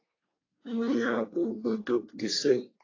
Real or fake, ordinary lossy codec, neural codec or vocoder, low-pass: fake; AAC, 32 kbps; codec, 24 kHz, 1 kbps, SNAC; 7.2 kHz